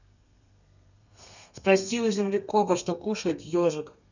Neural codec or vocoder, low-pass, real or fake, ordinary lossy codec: codec, 32 kHz, 1.9 kbps, SNAC; 7.2 kHz; fake; none